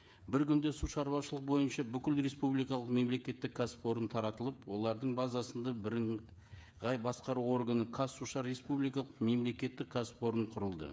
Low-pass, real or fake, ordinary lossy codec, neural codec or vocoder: none; fake; none; codec, 16 kHz, 8 kbps, FreqCodec, smaller model